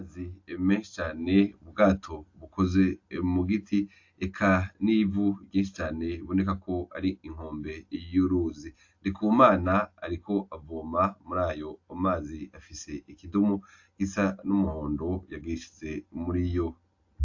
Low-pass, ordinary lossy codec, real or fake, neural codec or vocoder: 7.2 kHz; AAC, 48 kbps; real; none